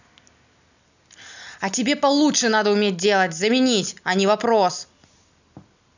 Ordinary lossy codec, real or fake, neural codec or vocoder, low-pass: none; real; none; 7.2 kHz